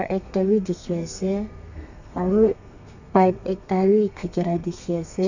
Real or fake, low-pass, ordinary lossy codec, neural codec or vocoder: fake; 7.2 kHz; none; codec, 32 kHz, 1.9 kbps, SNAC